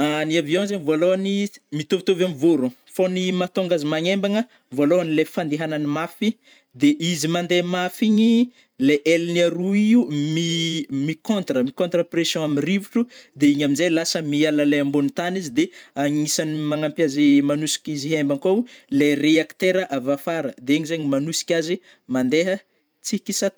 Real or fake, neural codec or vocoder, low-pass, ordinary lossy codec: fake; vocoder, 44.1 kHz, 128 mel bands every 512 samples, BigVGAN v2; none; none